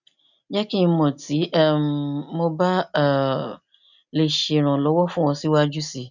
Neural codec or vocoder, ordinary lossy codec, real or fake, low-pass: none; none; real; 7.2 kHz